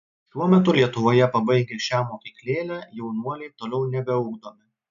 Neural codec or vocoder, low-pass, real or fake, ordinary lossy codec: none; 7.2 kHz; real; MP3, 64 kbps